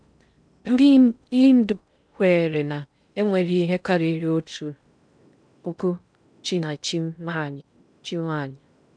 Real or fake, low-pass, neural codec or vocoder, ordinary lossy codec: fake; 9.9 kHz; codec, 16 kHz in and 24 kHz out, 0.6 kbps, FocalCodec, streaming, 2048 codes; none